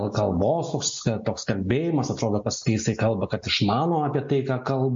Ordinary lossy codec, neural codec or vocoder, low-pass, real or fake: MP3, 48 kbps; none; 7.2 kHz; real